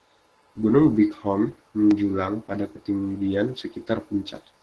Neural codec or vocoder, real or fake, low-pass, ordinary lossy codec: codec, 44.1 kHz, 7.8 kbps, Pupu-Codec; fake; 10.8 kHz; Opus, 16 kbps